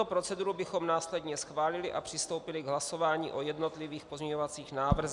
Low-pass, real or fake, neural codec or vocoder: 10.8 kHz; real; none